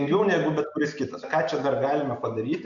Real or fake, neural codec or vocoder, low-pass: real; none; 7.2 kHz